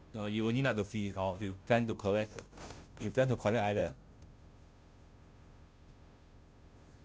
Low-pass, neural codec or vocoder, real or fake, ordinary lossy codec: none; codec, 16 kHz, 0.5 kbps, FunCodec, trained on Chinese and English, 25 frames a second; fake; none